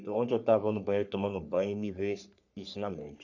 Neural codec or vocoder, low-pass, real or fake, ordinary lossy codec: codec, 44.1 kHz, 3.4 kbps, Pupu-Codec; 7.2 kHz; fake; none